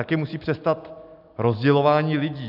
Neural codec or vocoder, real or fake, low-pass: none; real; 5.4 kHz